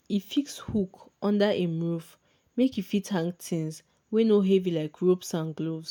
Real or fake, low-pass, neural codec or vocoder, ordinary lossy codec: real; 19.8 kHz; none; none